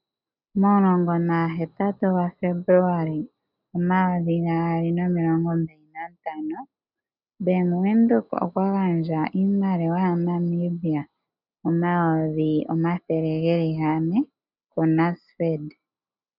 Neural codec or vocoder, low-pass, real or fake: none; 5.4 kHz; real